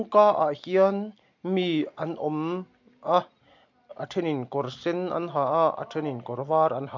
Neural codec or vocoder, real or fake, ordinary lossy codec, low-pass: none; real; MP3, 48 kbps; 7.2 kHz